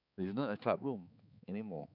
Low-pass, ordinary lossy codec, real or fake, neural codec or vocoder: 5.4 kHz; none; fake; codec, 16 kHz, 4 kbps, X-Codec, HuBERT features, trained on balanced general audio